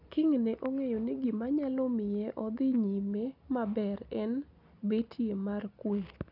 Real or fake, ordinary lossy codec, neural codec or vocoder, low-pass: real; none; none; 5.4 kHz